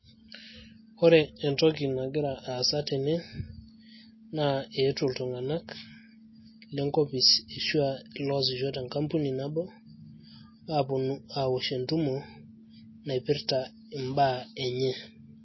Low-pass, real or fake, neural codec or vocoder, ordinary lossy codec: 7.2 kHz; real; none; MP3, 24 kbps